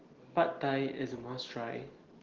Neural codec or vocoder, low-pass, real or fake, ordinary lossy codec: none; 7.2 kHz; real; Opus, 16 kbps